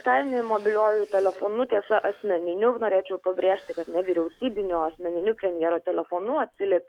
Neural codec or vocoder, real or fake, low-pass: codec, 44.1 kHz, 7.8 kbps, DAC; fake; 19.8 kHz